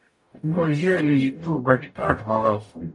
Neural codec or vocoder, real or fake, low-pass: codec, 44.1 kHz, 0.9 kbps, DAC; fake; 10.8 kHz